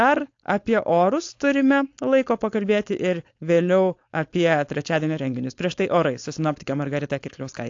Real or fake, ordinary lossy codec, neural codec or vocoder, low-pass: fake; AAC, 48 kbps; codec, 16 kHz, 4.8 kbps, FACodec; 7.2 kHz